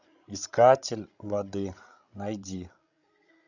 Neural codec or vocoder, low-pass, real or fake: codec, 16 kHz, 16 kbps, FreqCodec, larger model; 7.2 kHz; fake